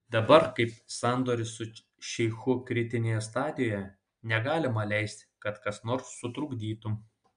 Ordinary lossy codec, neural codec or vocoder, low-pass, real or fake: MP3, 64 kbps; none; 9.9 kHz; real